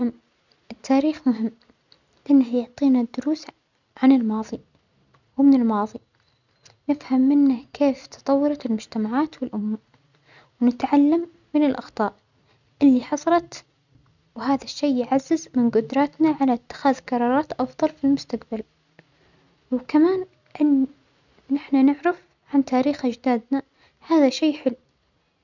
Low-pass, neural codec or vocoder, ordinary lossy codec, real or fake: 7.2 kHz; none; none; real